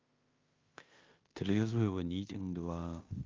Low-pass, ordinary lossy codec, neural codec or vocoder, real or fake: 7.2 kHz; Opus, 32 kbps; codec, 16 kHz in and 24 kHz out, 0.9 kbps, LongCat-Audio-Codec, fine tuned four codebook decoder; fake